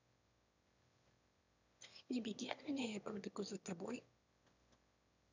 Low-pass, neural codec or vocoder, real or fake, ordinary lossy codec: 7.2 kHz; autoencoder, 22.05 kHz, a latent of 192 numbers a frame, VITS, trained on one speaker; fake; none